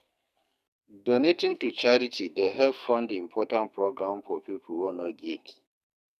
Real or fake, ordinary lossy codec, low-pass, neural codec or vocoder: fake; none; 14.4 kHz; codec, 44.1 kHz, 2.6 kbps, SNAC